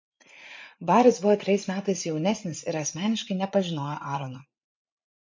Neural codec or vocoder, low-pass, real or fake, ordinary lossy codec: vocoder, 44.1 kHz, 80 mel bands, Vocos; 7.2 kHz; fake; MP3, 48 kbps